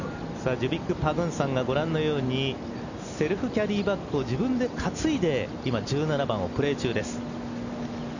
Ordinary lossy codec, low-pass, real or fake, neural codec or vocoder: none; 7.2 kHz; real; none